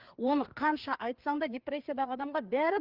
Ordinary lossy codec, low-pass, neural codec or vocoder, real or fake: Opus, 32 kbps; 5.4 kHz; codec, 16 kHz, 4 kbps, FreqCodec, larger model; fake